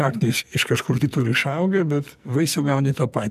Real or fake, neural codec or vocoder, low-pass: fake; codec, 44.1 kHz, 2.6 kbps, SNAC; 14.4 kHz